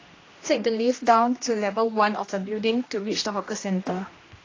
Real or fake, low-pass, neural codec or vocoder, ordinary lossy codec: fake; 7.2 kHz; codec, 16 kHz, 1 kbps, X-Codec, HuBERT features, trained on general audio; AAC, 32 kbps